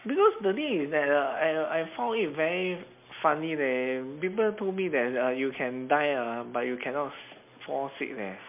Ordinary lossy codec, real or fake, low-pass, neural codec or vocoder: MP3, 32 kbps; real; 3.6 kHz; none